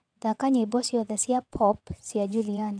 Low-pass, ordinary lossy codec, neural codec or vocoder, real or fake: 9.9 kHz; none; vocoder, 22.05 kHz, 80 mel bands, WaveNeXt; fake